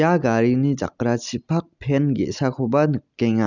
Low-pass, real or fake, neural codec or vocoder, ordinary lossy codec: 7.2 kHz; real; none; none